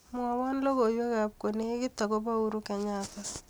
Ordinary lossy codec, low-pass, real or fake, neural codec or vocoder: none; none; real; none